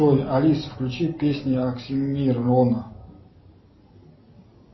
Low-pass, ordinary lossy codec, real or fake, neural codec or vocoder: 7.2 kHz; MP3, 24 kbps; real; none